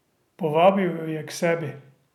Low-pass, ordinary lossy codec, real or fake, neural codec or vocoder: 19.8 kHz; none; real; none